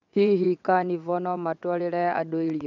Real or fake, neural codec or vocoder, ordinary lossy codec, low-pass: fake; vocoder, 22.05 kHz, 80 mel bands, WaveNeXt; none; 7.2 kHz